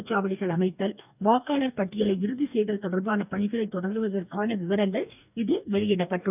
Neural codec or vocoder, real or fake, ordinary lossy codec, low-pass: codec, 44.1 kHz, 2.6 kbps, DAC; fake; none; 3.6 kHz